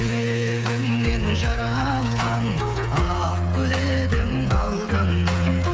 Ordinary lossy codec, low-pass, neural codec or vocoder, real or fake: none; none; codec, 16 kHz, 8 kbps, FreqCodec, smaller model; fake